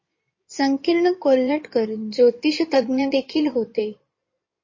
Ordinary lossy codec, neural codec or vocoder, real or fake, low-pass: MP3, 32 kbps; codec, 16 kHz in and 24 kHz out, 2.2 kbps, FireRedTTS-2 codec; fake; 7.2 kHz